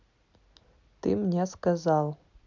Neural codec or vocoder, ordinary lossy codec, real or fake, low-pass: none; none; real; 7.2 kHz